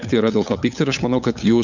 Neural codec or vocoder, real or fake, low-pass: codec, 16 kHz, 8 kbps, FunCodec, trained on LibriTTS, 25 frames a second; fake; 7.2 kHz